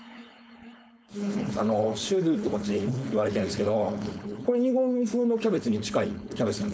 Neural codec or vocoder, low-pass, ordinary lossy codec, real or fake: codec, 16 kHz, 4.8 kbps, FACodec; none; none; fake